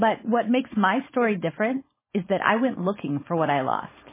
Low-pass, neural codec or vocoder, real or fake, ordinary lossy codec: 3.6 kHz; codec, 16 kHz, 4.8 kbps, FACodec; fake; MP3, 16 kbps